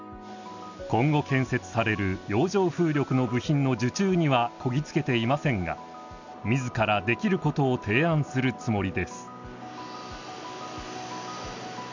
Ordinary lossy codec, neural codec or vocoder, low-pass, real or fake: none; none; 7.2 kHz; real